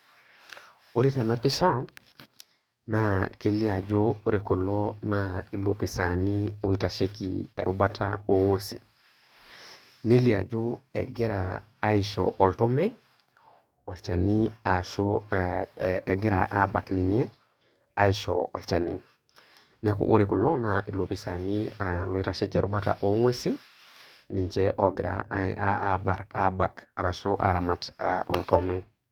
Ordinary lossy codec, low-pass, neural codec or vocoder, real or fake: none; 19.8 kHz; codec, 44.1 kHz, 2.6 kbps, DAC; fake